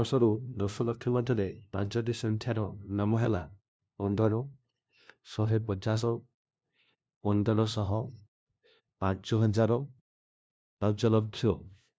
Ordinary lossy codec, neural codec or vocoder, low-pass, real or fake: none; codec, 16 kHz, 0.5 kbps, FunCodec, trained on LibriTTS, 25 frames a second; none; fake